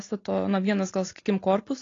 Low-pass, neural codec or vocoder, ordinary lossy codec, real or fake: 7.2 kHz; none; AAC, 32 kbps; real